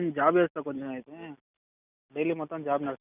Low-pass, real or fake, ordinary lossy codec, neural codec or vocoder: 3.6 kHz; real; none; none